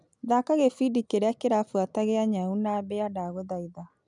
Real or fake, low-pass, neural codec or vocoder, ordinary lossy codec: real; 10.8 kHz; none; none